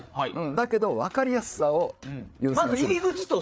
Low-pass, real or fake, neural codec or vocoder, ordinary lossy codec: none; fake; codec, 16 kHz, 8 kbps, FreqCodec, larger model; none